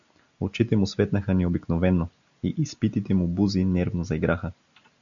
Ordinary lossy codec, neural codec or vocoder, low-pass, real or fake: MP3, 96 kbps; none; 7.2 kHz; real